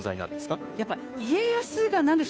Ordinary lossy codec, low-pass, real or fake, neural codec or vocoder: none; none; fake; codec, 16 kHz, 2 kbps, FunCodec, trained on Chinese and English, 25 frames a second